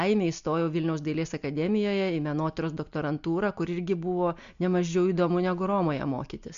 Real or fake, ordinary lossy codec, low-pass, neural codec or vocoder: real; MP3, 64 kbps; 7.2 kHz; none